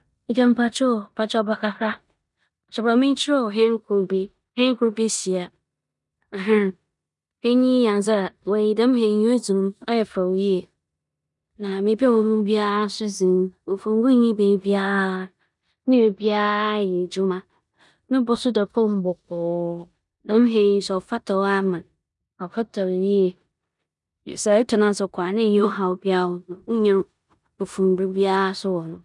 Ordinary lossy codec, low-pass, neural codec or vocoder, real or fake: none; 10.8 kHz; codec, 16 kHz in and 24 kHz out, 0.9 kbps, LongCat-Audio-Codec, four codebook decoder; fake